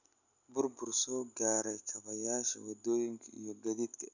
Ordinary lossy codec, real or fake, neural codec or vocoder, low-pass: none; real; none; 7.2 kHz